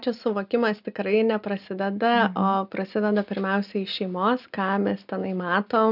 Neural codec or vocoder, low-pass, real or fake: none; 5.4 kHz; real